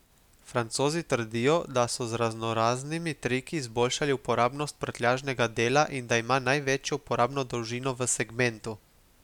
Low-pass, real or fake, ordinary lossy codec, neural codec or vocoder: 19.8 kHz; real; none; none